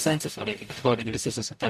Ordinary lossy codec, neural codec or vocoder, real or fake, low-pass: MP3, 64 kbps; codec, 44.1 kHz, 0.9 kbps, DAC; fake; 14.4 kHz